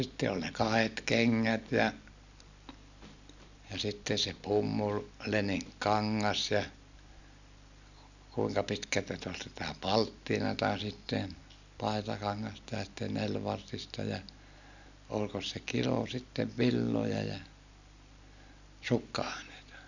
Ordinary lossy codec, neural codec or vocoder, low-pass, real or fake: none; none; 7.2 kHz; real